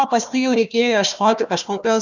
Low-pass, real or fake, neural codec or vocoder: 7.2 kHz; fake; codec, 16 kHz, 1 kbps, FunCodec, trained on Chinese and English, 50 frames a second